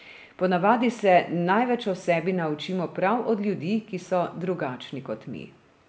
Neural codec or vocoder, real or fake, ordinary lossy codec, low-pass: none; real; none; none